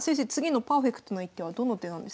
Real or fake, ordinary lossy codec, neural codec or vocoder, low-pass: real; none; none; none